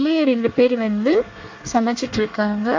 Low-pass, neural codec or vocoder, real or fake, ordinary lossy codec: 7.2 kHz; codec, 24 kHz, 1 kbps, SNAC; fake; AAC, 48 kbps